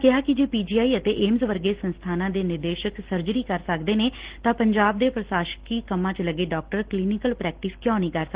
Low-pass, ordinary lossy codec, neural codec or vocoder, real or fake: 3.6 kHz; Opus, 16 kbps; none; real